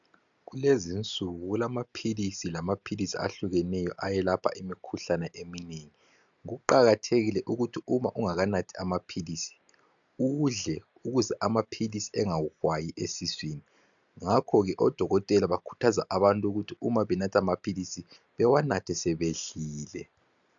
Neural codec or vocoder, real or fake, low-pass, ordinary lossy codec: none; real; 7.2 kHz; MP3, 96 kbps